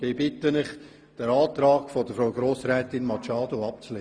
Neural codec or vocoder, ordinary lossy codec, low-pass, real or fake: none; Opus, 32 kbps; 9.9 kHz; real